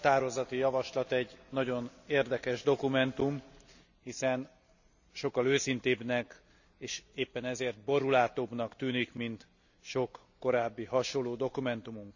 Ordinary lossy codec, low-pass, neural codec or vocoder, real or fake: none; 7.2 kHz; none; real